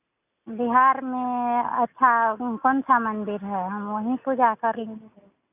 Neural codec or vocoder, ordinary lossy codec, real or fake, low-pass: none; none; real; 3.6 kHz